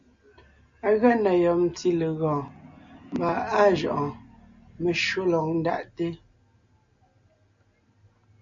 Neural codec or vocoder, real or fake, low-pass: none; real; 7.2 kHz